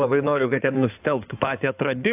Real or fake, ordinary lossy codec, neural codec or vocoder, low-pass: fake; AAC, 32 kbps; codec, 16 kHz in and 24 kHz out, 2.2 kbps, FireRedTTS-2 codec; 3.6 kHz